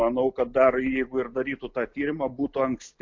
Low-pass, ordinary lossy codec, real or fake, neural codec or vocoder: 7.2 kHz; MP3, 64 kbps; real; none